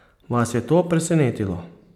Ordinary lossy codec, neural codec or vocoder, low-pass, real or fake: MP3, 96 kbps; none; 19.8 kHz; real